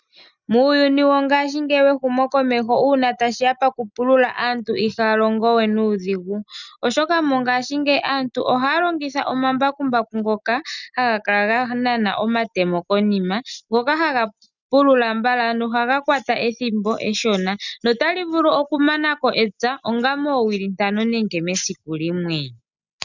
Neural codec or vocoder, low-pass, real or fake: none; 7.2 kHz; real